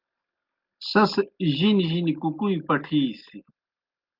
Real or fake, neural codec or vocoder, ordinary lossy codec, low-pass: real; none; Opus, 24 kbps; 5.4 kHz